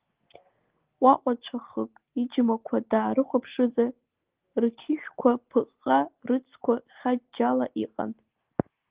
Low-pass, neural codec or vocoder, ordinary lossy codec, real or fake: 3.6 kHz; none; Opus, 16 kbps; real